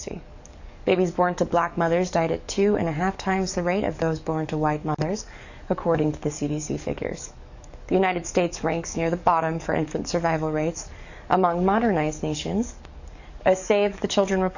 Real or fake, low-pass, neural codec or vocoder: fake; 7.2 kHz; codec, 44.1 kHz, 7.8 kbps, DAC